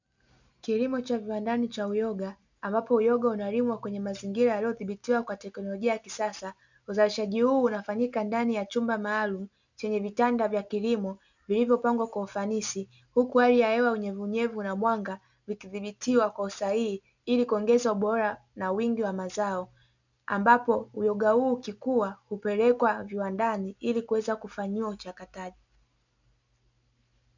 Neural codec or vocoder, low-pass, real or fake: none; 7.2 kHz; real